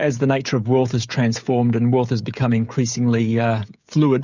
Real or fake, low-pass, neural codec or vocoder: fake; 7.2 kHz; codec, 16 kHz, 16 kbps, FreqCodec, smaller model